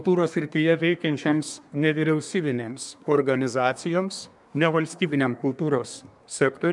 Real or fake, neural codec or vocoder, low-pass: fake; codec, 24 kHz, 1 kbps, SNAC; 10.8 kHz